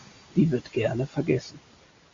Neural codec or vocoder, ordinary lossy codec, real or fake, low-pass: none; MP3, 48 kbps; real; 7.2 kHz